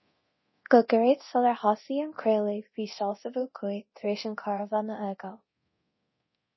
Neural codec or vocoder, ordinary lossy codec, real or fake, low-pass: codec, 24 kHz, 0.9 kbps, DualCodec; MP3, 24 kbps; fake; 7.2 kHz